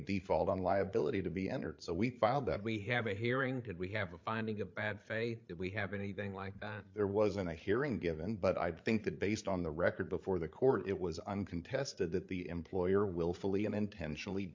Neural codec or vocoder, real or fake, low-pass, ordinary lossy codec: codec, 16 kHz, 8 kbps, FreqCodec, larger model; fake; 7.2 kHz; MP3, 48 kbps